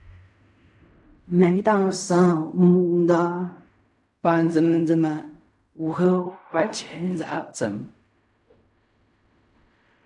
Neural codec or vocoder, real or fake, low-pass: codec, 16 kHz in and 24 kHz out, 0.4 kbps, LongCat-Audio-Codec, fine tuned four codebook decoder; fake; 10.8 kHz